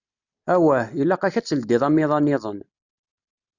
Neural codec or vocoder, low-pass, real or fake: none; 7.2 kHz; real